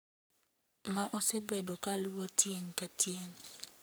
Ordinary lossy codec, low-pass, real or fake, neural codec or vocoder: none; none; fake; codec, 44.1 kHz, 3.4 kbps, Pupu-Codec